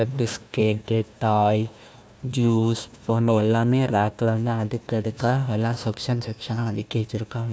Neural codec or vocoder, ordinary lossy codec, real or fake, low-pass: codec, 16 kHz, 1 kbps, FunCodec, trained on Chinese and English, 50 frames a second; none; fake; none